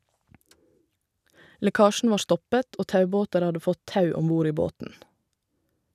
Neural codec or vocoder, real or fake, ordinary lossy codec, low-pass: none; real; none; 14.4 kHz